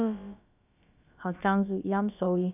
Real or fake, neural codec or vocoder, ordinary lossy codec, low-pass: fake; codec, 16 kHz, about 1 kbps, DyCAST, with the encoder's durations; none; 3.6 kHz